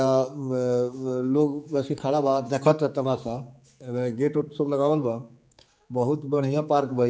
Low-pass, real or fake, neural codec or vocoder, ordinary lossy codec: none; fake; codec, 16 kHz, 4 kbps, X-Codec, HuBERT features, trained on general audio; none